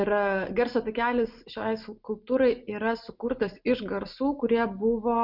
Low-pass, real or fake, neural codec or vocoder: 5.4 kHz; real; none